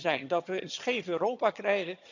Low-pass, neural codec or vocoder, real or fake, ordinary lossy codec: 7.2 kHz; vocoder, 22.05 kHz, 80 mel bands, HiFi-GAN; fake; none